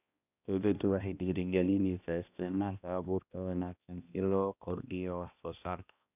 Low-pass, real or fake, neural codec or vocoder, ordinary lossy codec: 3.6 kHz; fake; codec, 16 kHz, 1 kbps, X-Codec, HuBERT features, trained on balanced general audio; none